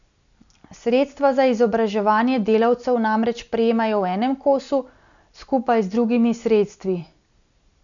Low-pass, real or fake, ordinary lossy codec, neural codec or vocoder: 7.2 kHz; real; none; none